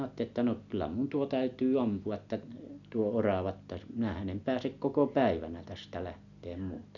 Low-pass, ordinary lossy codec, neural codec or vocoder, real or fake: 7.2 kHz; none; none; real